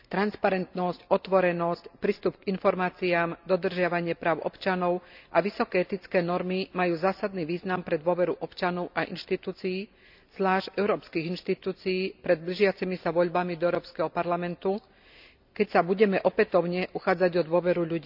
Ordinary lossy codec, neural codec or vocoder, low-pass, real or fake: none; none; 5.4 kHz; real